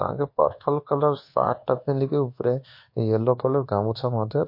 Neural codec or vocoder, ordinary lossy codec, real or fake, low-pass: codec, 24 kHz, 1.2 kbps, DualCodec; MP3, 32 kbps; fake; 5.4 kHz